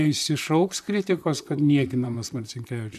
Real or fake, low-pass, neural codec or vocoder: fake; 14.4 kHz; vocoder, 44.1 kHz, 128 mel bands, Pupu-Vocoder